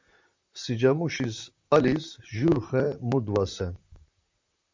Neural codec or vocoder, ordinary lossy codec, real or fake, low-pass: vocoder, 44.1 kHz, 128 mel bands, Pupu-Vocoder; MP3, 64 kbps; fake; 7.2 kHz